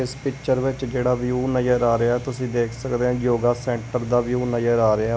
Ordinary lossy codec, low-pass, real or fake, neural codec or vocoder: none; none; real; none